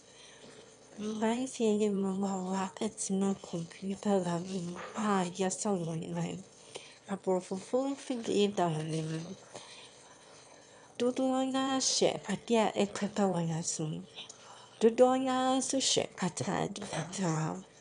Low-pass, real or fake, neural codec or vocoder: 9.9 kHz; fake; autoencoder, 22.05 kHz, a latent of 192 numbers a frame, VITS, trained on one speaker